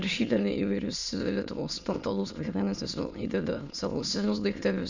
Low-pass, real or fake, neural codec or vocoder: 7.2 kHz; fake; autoencoder, 22.05 kHz, a latent of 192 numbers a frame, VITS, trained on many speakers